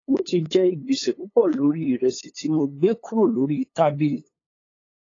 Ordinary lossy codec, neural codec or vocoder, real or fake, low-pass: AAC, 32 kbps; codec, 16 kHz, 8 kbps, FunCodec, trained on LibriTTS, 25 frames a second; fake; 7.2 kHz